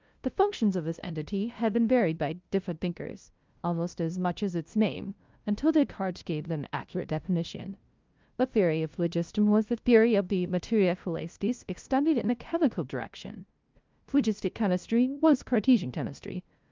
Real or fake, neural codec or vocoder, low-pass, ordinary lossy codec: fake; codec, 16 kHz, 0.5 kbps, FunCodec, trained on LibriTTS, 25 frames a second; 7.2 kHz; Opus, 24 kbps